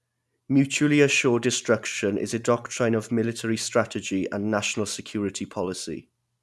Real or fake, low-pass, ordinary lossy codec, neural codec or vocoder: real; none; none; none